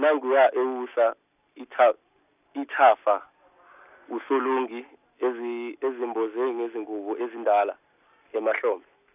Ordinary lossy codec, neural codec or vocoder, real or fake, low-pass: none; none; real; 3.6 kHz